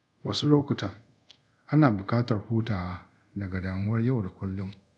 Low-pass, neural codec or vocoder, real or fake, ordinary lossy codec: 10.8 kHz; codec, 24 kHz, 0.5 kbps, DualCodec; fake; none